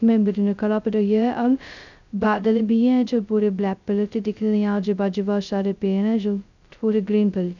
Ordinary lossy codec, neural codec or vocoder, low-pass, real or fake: none; codec, 16 kHz, 0.2 kbps, FocalCodec; 7.2 kHz; fake